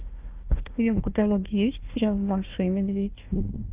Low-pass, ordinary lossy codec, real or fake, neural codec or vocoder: 3.6 kHz; Opus, 32 kbps; fake; codec, 16 kHz, 1 kbps, FunCodec, trained on Chinese and English, 50 frames a second